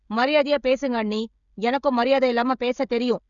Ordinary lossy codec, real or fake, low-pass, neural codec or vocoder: none; fake; 7.2 kHz; codec, 16 kHz, 16 kbps, FreqCodec, smaller model